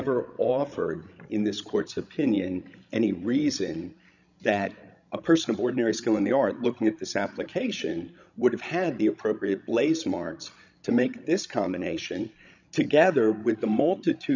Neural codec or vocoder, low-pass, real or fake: codec, 16 kHz, 16 kbps, FreqCodec, larger model; 7.2 kHz; fake